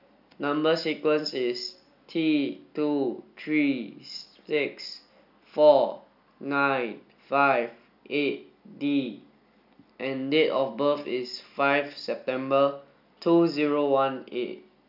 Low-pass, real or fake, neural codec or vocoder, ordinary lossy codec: 5.4 kHz; real; none; none